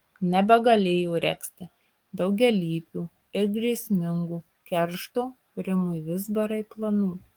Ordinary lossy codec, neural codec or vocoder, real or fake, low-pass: Opus, 32 kbps; codec, 44.1 kHz, 7.8 kbps, DAC; fake; 19.8 kHz